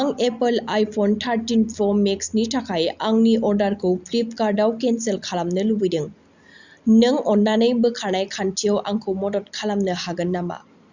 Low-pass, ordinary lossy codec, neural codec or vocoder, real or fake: 7.2 kHz; Opus, 64 kbps; none; real